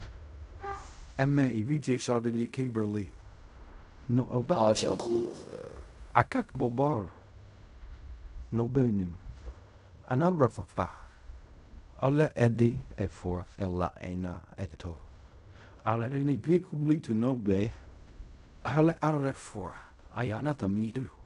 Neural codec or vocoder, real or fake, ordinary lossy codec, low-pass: codec, 16 kHz in and 24 kHz out, 0.4 kbps, LongCat-Audio-Codec, fine tuned four codebook decoder; fake; AAC, 96 kbps; 10.8 kHz